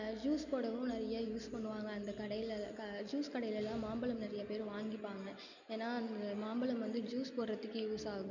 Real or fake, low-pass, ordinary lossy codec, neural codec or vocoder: real; 7.2 kHz; none; none